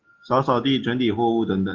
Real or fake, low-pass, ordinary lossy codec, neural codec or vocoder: real; 7.2 kHz; Opus, 16 kbps; none